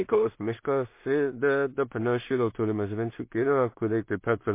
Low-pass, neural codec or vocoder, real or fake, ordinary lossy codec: 3.6 kHz; codec, 16 kHz in and 24 kHz out, 0.4 kbps, LongCat-Audio-Codec, two codebook decoder; fake; MP3, 24 kbps